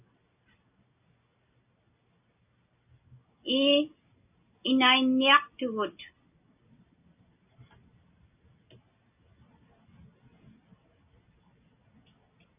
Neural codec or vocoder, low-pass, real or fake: none; 3.6 kHz; real